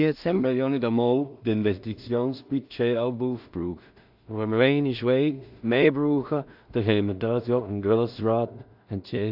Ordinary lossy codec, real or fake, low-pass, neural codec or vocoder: AAC, 48 kbps; fake; 5.4 kHz; codec, 16 kHz in and 24 kHz out, 0.4 kbps, LongCat-Audio-Codec, two codebook decoder